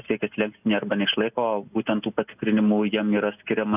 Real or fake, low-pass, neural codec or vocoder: real; 3.6 kHz; none